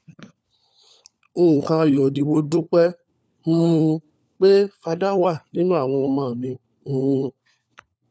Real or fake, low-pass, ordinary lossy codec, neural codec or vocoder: fake; none; none; codec, 16 kHz, 4 kbps, FunCodec, trained on LibriTTS, 50 frames a second